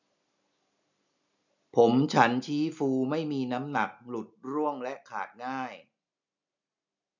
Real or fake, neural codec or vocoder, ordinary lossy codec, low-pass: real; none; none; 7.2 kHz